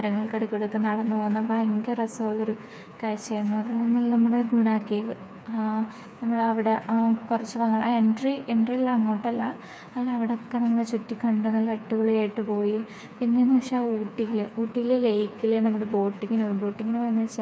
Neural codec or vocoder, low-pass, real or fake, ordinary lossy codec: codec, 16 kHz, 4 kbps, FreqCodec, smaller model; none; fake; none